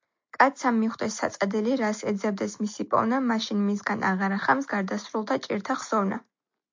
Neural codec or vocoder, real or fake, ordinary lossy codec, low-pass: none; real; MP3, 48 kbps; 7.2 kHz